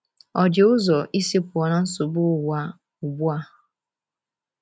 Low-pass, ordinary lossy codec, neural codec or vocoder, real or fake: none; none; none; real